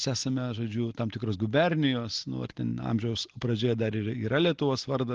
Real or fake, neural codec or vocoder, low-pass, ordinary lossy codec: real; none; 7.2 kHz; Opus, 16 kbps